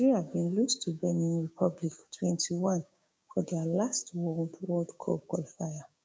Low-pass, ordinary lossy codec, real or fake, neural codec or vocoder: none; none; fake; codec, 16 kHz, 6 kbps, DAC